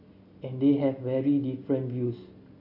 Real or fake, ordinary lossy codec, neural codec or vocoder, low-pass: real; AAC, 32 kbps; none; 5.4 kHz